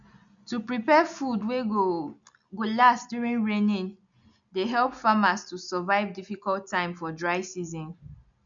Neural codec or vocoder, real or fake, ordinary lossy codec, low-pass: none; real; none; 7.2 kHz